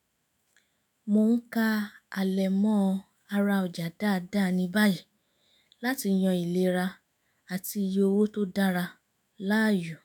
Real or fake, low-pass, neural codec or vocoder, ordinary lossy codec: fake; none; autoencoder, 48 kHz, 128 numbers a frame, DAC-VAE, trained on Japanese speech; none